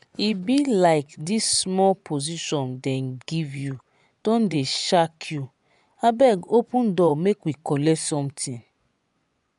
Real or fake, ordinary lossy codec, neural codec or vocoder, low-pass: fake; none; vocoder, 24 kHz, 100 mel bands, Vocos; 10.8 kHz